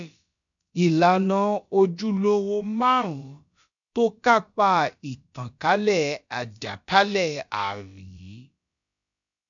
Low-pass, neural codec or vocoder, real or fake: 7.2 kHz; codec, 16 kHz, about 1 kbps, DyCAST, with the encoder's durations; fake